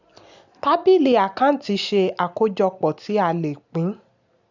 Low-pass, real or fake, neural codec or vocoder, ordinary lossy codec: 7.2 kHz; real; none; none